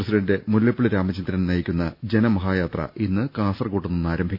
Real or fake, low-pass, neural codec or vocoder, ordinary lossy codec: real; 5.4 kHz; none; none